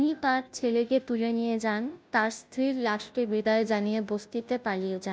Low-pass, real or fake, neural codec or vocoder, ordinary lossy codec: none; fake; codec, 16 kHz, 0.5 kbps, FunCodec, trained on Chinese and English, 25 frames a second; none